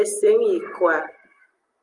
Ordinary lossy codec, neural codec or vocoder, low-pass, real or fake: Opus, 32 kbps; none; 10.8 kHz; real